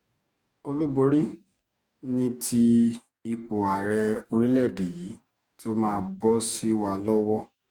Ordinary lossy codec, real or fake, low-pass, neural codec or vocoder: Opus, 64 kbps; fake; 19.8 kHz; codec, 44.1 kHz, 2.6 kbps, DAC